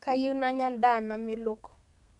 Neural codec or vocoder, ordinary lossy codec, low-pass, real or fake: codec, 32 kHz, 1.9 kbps, SNAC; none; 10.8 kHz; fake